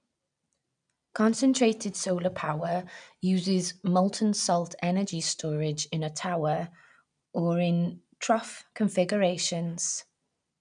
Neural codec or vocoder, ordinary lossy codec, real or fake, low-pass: none; none; real; 9.9 kHz